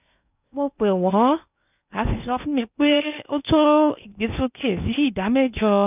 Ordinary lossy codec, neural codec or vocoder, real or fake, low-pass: none; codec, 16 kHz in and 24 kHz out, 0.8 kbps, FocalCodec, streaming, 65536 codes; fake; 3.6 kHz